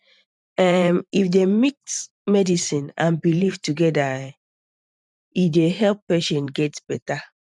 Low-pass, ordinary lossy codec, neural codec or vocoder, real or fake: 10.8 kHz; none; vocoder, 44.1 kHz, 128 mel bands every 512 samples, BigVGAN v2; fake